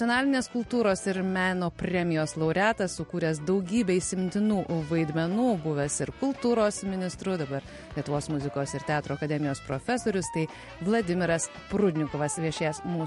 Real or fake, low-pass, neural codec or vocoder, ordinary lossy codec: real; 14.4 kHz; none; MP3, 48 kbps